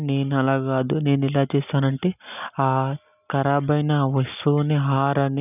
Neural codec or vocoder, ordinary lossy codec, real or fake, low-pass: none; none; real; 3.6 kHz